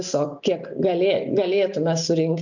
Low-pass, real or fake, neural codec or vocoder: 7.2 kHz; real; none